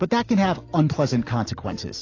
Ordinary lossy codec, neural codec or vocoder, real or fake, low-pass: AAC, 32 kbps; none; real; 7.2 kHz